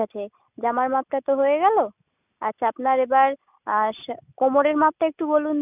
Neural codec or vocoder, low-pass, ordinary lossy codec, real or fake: none; 3.6 kHz; none; real